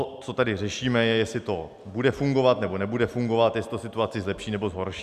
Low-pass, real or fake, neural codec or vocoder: 14.4 kHz; real; none